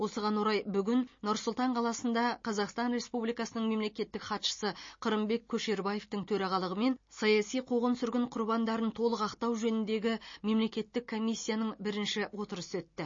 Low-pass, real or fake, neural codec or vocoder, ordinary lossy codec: 7.2 kHz; real; none; MP3, 32 kbps